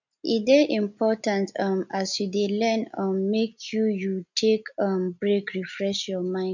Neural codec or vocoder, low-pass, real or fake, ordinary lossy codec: none; 7.2 kHz; real; none